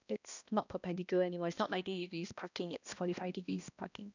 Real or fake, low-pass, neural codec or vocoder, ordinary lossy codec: fake; 7.2 kHz; codec, 16 kHz, 1 kbps, X-Codec, HuBERT features, trained on balanced general audio; none